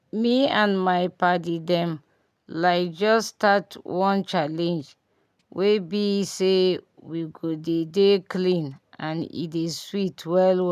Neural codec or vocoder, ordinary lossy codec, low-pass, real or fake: none; none; 14.4 kHz; real